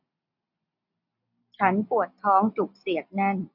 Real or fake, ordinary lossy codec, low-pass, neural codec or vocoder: real; none; 5.4 kHz; none